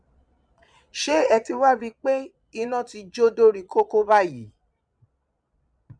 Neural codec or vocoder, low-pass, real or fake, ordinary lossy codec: vocoder, 22.05 kHz, 80 mel bands, Vocos; 9.9 kHz; fake; none